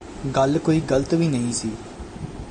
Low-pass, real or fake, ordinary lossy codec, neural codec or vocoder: 9.9 kHz; real; MP3, 48 kbps; none